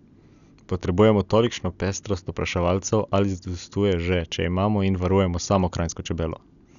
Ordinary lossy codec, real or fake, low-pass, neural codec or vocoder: none; real; 7.2 kHz; none